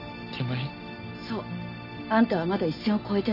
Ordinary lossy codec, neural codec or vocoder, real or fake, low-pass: none; none; real; 5.4 kHz